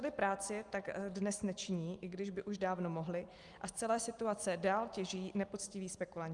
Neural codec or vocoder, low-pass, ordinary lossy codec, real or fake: none; 10.8 kHz; Opus, 32 kbps; real